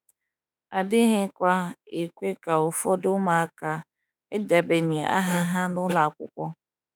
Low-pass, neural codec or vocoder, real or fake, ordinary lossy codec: none; autoencoder, 48 kHz, 32 numbers a frame, DAC-VAE, trained on Japanese speech; fake; none